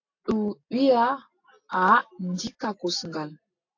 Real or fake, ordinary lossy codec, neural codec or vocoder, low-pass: real; AAC, 48 kbps; none; 7.2 kHz